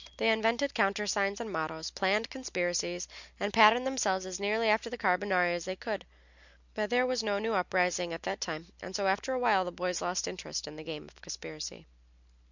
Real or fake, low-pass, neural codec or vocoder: real; 7.2 kHz; none